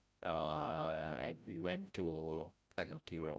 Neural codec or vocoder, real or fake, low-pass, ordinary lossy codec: codec, 16 kHz, 0.5 kbps, FreqCodec, larger model; fake; none; none